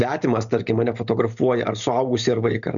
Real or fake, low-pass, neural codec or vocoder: real; 7.2 kHz; none